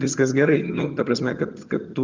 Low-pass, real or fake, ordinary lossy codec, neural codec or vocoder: 7.2 kHz; fake; Opus, 24 kbps; vocoder, 22.05 kHz, 80 mel bands, HiFi-GAN